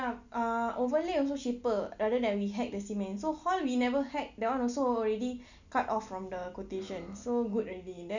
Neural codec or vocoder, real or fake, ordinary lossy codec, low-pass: none; real; none; 7.2 kHz